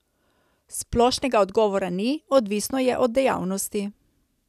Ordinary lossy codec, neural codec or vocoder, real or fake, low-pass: none; none; real; 14.4 kHz